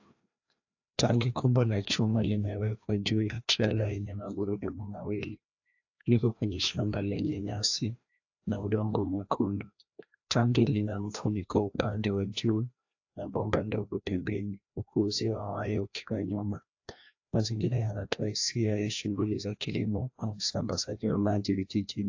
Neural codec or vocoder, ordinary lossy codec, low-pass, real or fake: codec, 16 kHz, 1 kbps, FreqCodec, larger model; AAC, 48 kbps; 7.2 kHz; fake